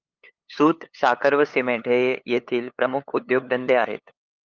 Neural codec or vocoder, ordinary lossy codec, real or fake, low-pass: codec, 16 kHz, 8 kbps, FunCodec, trained on LibriTTS, 25 frames a second; Opus, 24 kbps; fake; 7.2 kHz